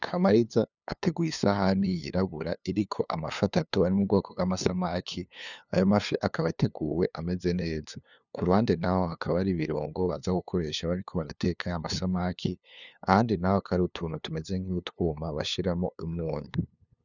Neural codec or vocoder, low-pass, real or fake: codec, 16 kHz, 2 kbps, FunCodec, trained on LibriTTS, 25 frames a second; 7.2 kHz; fake